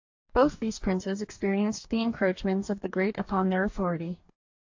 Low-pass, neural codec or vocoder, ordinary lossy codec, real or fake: 7.2 kHz; codec, 44.1 kHz, 2.6 kbps, DAC; AAC, 48 kbps; fake